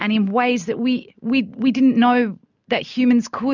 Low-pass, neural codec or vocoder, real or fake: 7.2 kHz; none; real